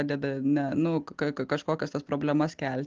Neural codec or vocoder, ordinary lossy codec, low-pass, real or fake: none; Opus, 16 kbps; 7.2 kHz; real